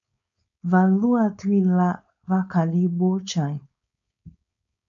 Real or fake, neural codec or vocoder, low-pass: fake; codec, 16 kHz, 4.8 kbps, FACodec; 7.2 kHz